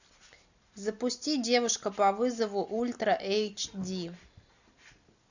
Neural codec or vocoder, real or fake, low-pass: none; real; 7.2 kHz